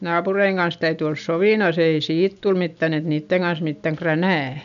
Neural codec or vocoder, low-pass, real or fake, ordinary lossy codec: none; 7.2 kHz; real; none